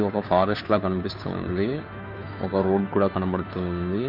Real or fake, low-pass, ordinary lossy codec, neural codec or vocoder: fake; 5.4 kHz; none; codec, 16 kHz, 2 kbps, FunCodec, trained on Chinese and English, 25 frames a second